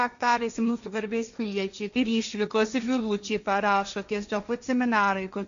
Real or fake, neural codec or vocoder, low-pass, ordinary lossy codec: fake; codec, 16 kHz, 1.1 kbps, Voila-Tokenizer; 7.2 kHz; MP3, 96 kbps